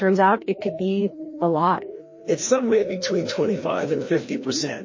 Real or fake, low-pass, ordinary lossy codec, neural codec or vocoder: fake; 7.2 kHz; MP3, 32 kbps; codec, 16 kHz, 1 kbps, FreqCodec, larger model